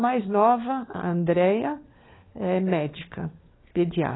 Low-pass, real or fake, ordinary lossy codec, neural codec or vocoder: 7.2 kHz; fake; AAC, 16 kbps; codec, 16 kHz, 4 kbps, FunCodec, trained on LibriTTS, 50 frames a second